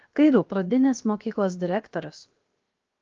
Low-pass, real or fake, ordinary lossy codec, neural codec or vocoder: 7.2 kHz; fake; Opus, 32 kbps; codec, 16 kHz, 0.7 kbps, FocalCodec